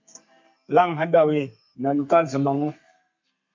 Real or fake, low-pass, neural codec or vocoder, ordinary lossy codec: fake; 7.2 kHz; codec, 32 kHz, 1.9 kbps, SNAC; MP3, 48 kbps